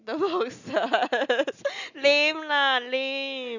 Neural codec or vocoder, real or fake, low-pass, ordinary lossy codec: none; real; 7.2 kHz; none